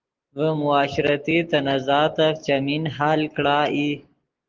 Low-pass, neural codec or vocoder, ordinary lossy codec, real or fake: 7.2 kHz; none; Opus, 16 kbps; real